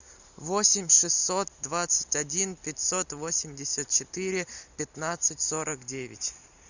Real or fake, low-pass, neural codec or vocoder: real; 7.2 kHz; none